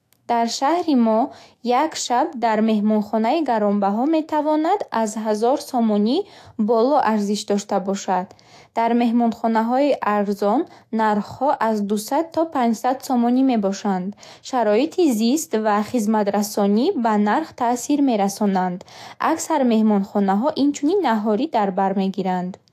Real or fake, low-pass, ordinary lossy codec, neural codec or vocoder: fake; 14.4 kHz; AAC, 64 kbps; autoencoder, 48 kHz, 128 numbers a frame, DAC-VAE, trained on Japanese speech